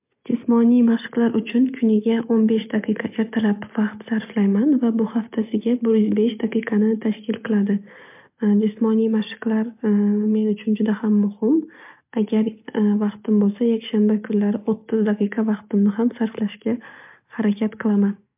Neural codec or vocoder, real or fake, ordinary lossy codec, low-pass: none; real; MP3, 32 kbps; 3.6 kHz